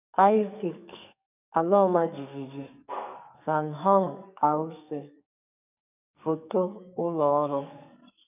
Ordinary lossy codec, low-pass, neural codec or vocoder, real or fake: none; 3.6 kHz; codec, 44.1 kHz, 2.6 kbps, SNAC; fake